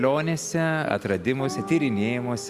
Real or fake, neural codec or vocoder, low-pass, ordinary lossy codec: real; none; 14.4 kHz; Opus, 64 kbps